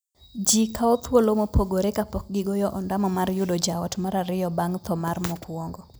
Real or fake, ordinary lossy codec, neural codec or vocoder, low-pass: real; none; none; none